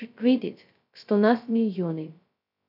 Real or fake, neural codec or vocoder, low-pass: fake; codec, 16 kHz, 0.2 kbps, FocalCodec; 5.4 kHz